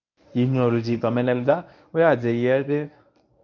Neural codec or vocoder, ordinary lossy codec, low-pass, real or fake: codec, 24 kHz, 0.9 kbps, WavTokenizer, medium speech release version 1; none; 7.2 kHz; fake